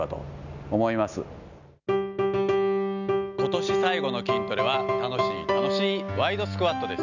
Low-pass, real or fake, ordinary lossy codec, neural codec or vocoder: 7.2 kHz; real; none; none